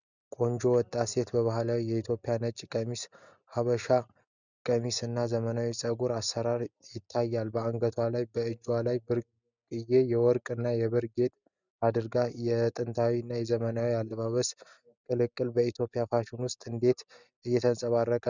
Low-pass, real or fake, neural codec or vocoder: 7.2 kHz; real; none